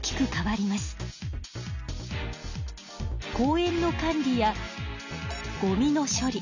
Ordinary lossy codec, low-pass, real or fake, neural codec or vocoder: none; 7.2 kHz; real; none